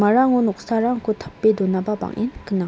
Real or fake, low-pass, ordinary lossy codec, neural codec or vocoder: real; none; none; none